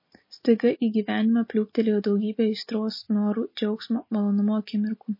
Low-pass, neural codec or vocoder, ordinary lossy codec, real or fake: 5.4 kHz; none; MP3, 24 kbps; real